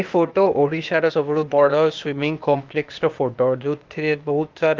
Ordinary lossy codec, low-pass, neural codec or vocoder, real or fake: Opus, 32 kbps; 7.2 kHz; codec, 16 kHz, 0.8 kbps, ZipCodec; fake